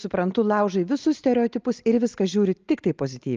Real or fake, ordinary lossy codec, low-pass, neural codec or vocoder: real; Opus, 24 kbps; 7.2 kHz; none